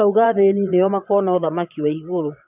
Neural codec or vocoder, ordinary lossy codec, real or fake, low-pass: vocoder, 22.05 kHz, 80 mel bands, Vocos; none; fake; 3.6 kHz